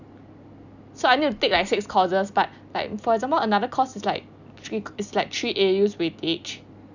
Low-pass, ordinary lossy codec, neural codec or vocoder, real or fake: 7.2 kHz; none; none; real